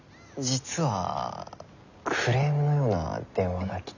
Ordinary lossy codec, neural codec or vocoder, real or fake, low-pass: none; none; real; 7.2 kHz